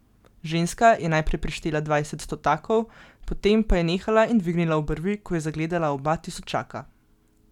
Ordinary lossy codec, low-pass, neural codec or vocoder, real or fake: none; 19.8 kHz; none; real